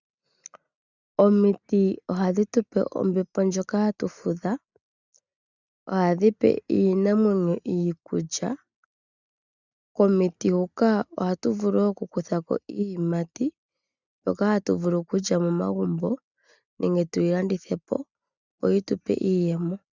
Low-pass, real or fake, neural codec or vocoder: 7.2 kHz; real; none